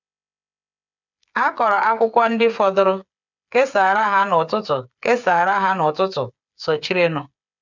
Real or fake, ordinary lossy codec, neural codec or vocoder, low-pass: fake; none; codec, 16 kHz, 8 kbps, FreqCodec, smaller model; 7.2 kHz